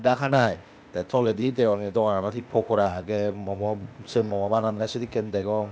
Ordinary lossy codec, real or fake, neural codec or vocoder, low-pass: none; fake; codec, 16 kHz, 0.8 kbps, ZipCodec; none